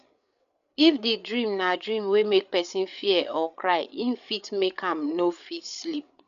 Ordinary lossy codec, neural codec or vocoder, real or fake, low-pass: none; codec, 16 kHz, 8 kbps, FreqCodec, larger model; fake; 7.2 kHz